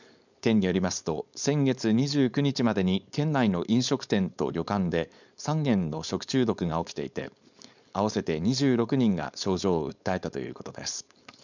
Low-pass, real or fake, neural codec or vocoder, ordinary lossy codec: 7.2 kHz; fake; codec, 16 kHz, 4.8 kbps, FACodec; none